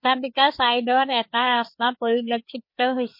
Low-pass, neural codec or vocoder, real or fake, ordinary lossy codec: 5.4 kHz; codec, 16 kHz, 4 kbps, FreqCodec, larger model; fake; MP3, 32 kbps